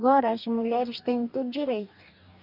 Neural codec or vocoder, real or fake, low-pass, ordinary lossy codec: codec, 44.1 kHz, 2.6 kbps, DAC; fake; 5.4 kHz; none